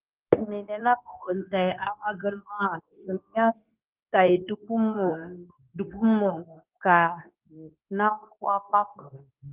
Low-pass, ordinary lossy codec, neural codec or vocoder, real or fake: 3.6 kHz; Opus, 24 kbps; codec, 16 kHz, 0.9 kbps, LongCat-Audio-Codec; fake